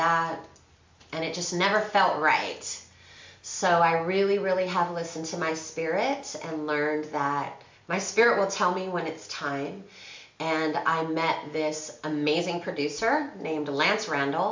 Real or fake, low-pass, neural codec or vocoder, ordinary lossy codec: real; 7.2 kHz; none; MP3, 64 kbps